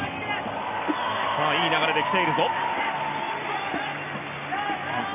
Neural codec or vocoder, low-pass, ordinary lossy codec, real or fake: none; 3.6 kHz; none; real